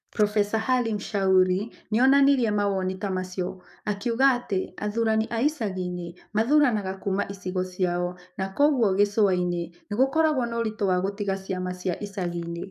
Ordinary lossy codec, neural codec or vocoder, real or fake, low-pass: none; codec, 44.1 kHz, 7.8 kbps, DAC; fake; 14.4 kHz